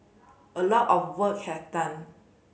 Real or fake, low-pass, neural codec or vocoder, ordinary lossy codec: real; none; none; none